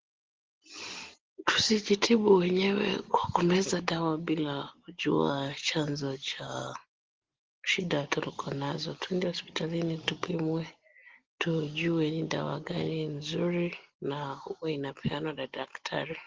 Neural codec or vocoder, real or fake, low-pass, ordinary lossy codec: none; real; 7.2 kHz; Opus, 16 kbps